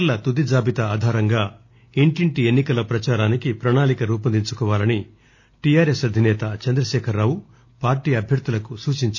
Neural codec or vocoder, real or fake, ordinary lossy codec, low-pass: none; real; none; none